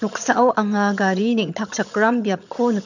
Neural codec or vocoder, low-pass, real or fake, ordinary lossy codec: vocoder, 22.05 kHz, 80 mel bands, HiFi-GAN; 7.2 kHz; fake; none